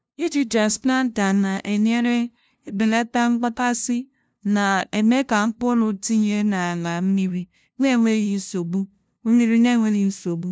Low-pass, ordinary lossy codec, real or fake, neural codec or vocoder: none; none; fake; codec, 16 kHz, 0.5 kbps, FunCodec, trained on LibriTTS, 25 frames a second